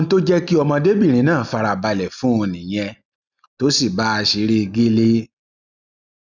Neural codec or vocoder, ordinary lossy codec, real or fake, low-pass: none; none; real; 7.2 kHz